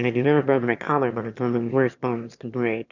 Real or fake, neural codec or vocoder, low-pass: fake; autoencoder, 22.05 kHz, a latent of 192 numbers a frame, VITS, trained on one speaker; 7.2 kHz